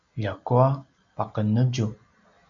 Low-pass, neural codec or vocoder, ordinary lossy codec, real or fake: 7.2 kHz; none; MP3, 64 kbps; real